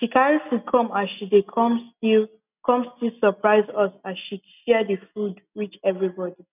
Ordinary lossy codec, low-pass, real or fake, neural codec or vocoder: none; 3.6 kHz; real; none